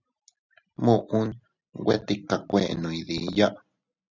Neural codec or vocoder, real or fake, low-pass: none; real; 7.2 kHz